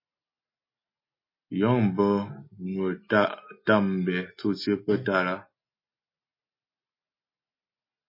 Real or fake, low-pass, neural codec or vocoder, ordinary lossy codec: real; 5.4 kHz; none; MP3, 24 kbps